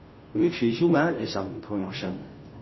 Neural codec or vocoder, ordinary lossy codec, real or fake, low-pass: codec, 16 kHz, 0.5 kbps, FunCodec, trained on Chinese and English, 25 frames a second; MP3, 24 kbps; fake; 7.2 kHz